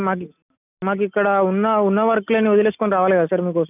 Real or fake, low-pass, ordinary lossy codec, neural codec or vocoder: real; 3.6 kHz; none; none